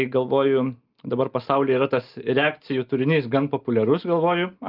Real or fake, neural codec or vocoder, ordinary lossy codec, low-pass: real; none; Opus, 24 kbps; 5.4 kHz